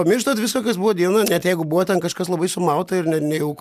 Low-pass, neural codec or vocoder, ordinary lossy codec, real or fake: 14.4 kHz; none; MP3, 96 kbps; real